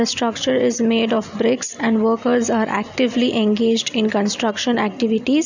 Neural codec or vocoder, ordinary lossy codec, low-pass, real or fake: none; none; 7.2 kHz; real